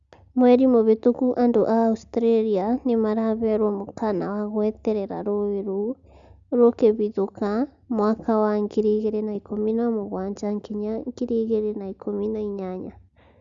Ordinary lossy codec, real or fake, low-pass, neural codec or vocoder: Opus, 64 kbps; real; 7.2 kHz; none